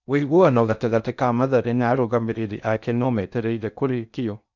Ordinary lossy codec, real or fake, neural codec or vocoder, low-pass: none; fake; codec, 16 kHz in and 24 kHz out, 0.6 kbps, FocalCodec, streaming, 4096 codes; 7.2 kHz